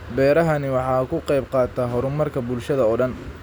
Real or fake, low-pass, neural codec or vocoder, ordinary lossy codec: real; none; none; none